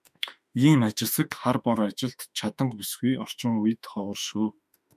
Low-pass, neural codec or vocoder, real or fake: 14.4 kHz; autoencoder, 48 kHz, 32 numbers a frame, DAC-VAE, trained on Japanese speech; fake